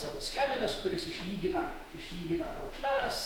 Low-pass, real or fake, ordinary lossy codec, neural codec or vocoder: 19.8 kHz; fake; MP3, 96 kbps; autoencoder, 48 kHz, 32 numbers a frame, DAC-VAE, trained on Japanese speech